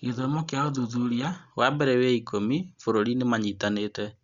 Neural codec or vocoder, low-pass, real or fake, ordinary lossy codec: none; 7.2 kHz; real; Opus, 64 kbps